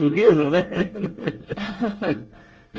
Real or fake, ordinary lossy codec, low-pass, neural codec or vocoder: fake; Opus, 24 kbps; 7.2 kHz; codec, 24 kHz, 1 kbps, SNAC